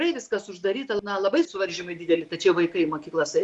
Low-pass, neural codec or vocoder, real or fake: 10.8 kHz; none; real